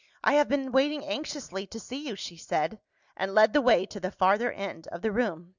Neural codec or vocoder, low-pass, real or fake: none; 7.2 kHz; real